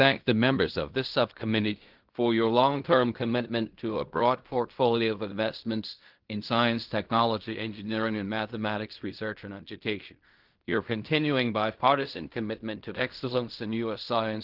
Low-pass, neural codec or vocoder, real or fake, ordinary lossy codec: 5.4 kHz; codec, 16 kHz in and 24 kHz out, 0.4 kbps, LongCat-Audio-Codec, fine tuned four codebook decoder; fake; Opus, 32 kbps